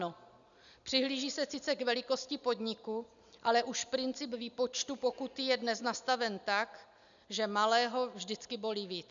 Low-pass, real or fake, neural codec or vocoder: 7.2 kHz; real; none